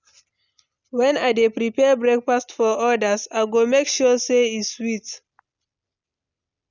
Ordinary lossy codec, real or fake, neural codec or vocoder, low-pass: none; real; none; 7.2 kHz